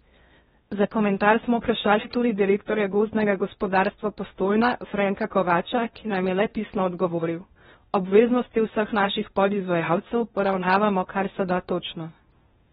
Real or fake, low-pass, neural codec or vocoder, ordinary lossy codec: fake; 10.8 kHz; codec, 16 kHz in and 24 kHz out, 0.8 kbps, FocalCodec, streaming, 65536 codes; AAC, 16 kbps